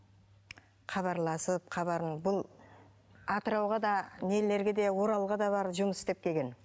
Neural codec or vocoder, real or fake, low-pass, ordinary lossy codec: none; real; none; none